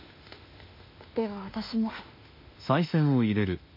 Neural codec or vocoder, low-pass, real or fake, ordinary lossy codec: autoencoder, 48 kHz, 32 numbers a frame, DAC-VAE, trained on Japanese speech; 5.4 kHz; fake; MP3, 32 kbps